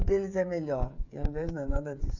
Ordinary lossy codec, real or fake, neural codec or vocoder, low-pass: none; fake; codec, 16 kHz, 16 kbps, FreqCodec, smaller model; 7.2 kHz